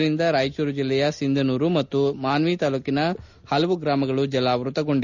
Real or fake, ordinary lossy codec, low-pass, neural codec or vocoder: real; none; 7.2 kHz; none